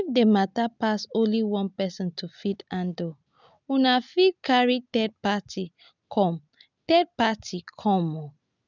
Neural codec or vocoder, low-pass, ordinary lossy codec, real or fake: none; 7.2 kHz; none; real